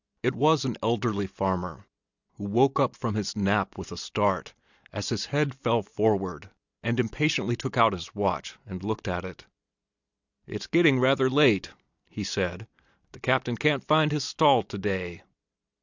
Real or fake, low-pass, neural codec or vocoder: real; 7.2 kHz; none